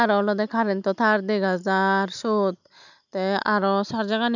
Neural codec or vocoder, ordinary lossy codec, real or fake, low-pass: none; none; real; 7.2 kHz